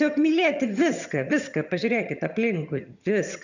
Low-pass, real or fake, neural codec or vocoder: 7.2 kHz; fake; vocoder, 22.05 kHz, 80 mel bands, HiFi-GAN